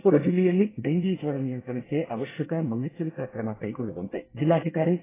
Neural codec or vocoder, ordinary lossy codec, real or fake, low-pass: codec, 24 kHz, 1 kbps, SNAC; AAC, 16 kbps; fake; 3.6 kHz